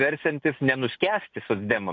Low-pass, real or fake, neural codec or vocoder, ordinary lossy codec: 7.2 kHz; real; none; AAC, 48 kbps